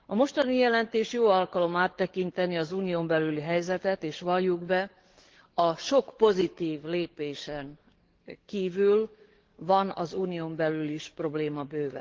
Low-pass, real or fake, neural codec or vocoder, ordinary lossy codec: 7.2 kHz; fake; codec, 44.1 kHz, 7.8 kbps, DAC; Opus, 16 kbps